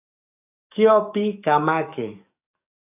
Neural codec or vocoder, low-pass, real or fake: codec, 44.1 kHz, 7.8 kbps, DAC; 3.6 kHz; fake